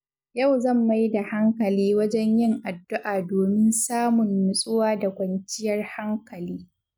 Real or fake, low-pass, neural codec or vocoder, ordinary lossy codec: real; none; none; none